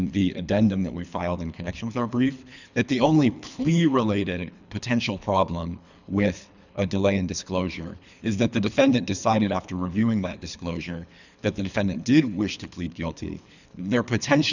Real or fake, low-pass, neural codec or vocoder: fake; 7.2 kHz; codec, 24 kHz, 3 kbps, HILCodec